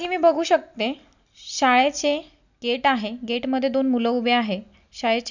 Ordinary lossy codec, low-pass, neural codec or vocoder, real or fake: none; 7.2 kHz; none; real